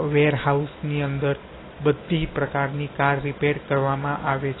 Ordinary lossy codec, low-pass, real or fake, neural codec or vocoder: AAC, 16 kbps; 7.2 kHz; real; none